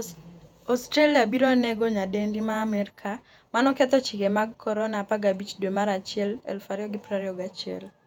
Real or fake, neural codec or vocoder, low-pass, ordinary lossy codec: fake; vocoder, 48 kHz, 128 mel bands, Vocos; 19.8 kHz; none